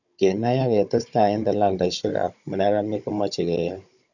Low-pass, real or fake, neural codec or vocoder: 7.2 kHz; fake; codec, 16 kHz, 16 kbps, FunCodec, trained on Chinese and English, 50 frames a second